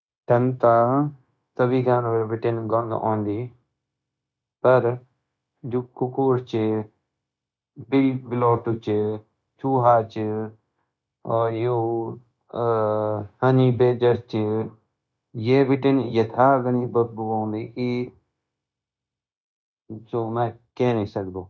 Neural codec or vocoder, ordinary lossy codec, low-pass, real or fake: codec, 16 kHz, 0.9 kbps, LongCat-Audio-Codec; none; none; fake